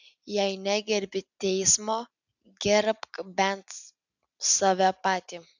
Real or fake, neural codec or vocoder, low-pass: real; none; 7.2 kHz